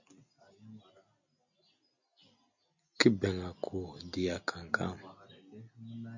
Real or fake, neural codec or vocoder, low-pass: real; none; 7.2 kHz